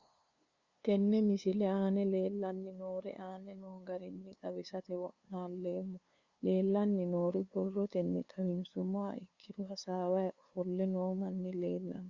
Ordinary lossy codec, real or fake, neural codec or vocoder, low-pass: Opus, 64 kbps; fake; codec, 16 kHz, 2 kbps, FunCodec, trained on LibriTTS, 25 frames a second; 7.2 kHz